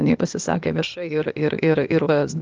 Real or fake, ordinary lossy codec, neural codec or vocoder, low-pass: fake; Opus, 32 kbps; codec, 16 kHz, 0.8 kbps, ZipCodec; 7.2 kHz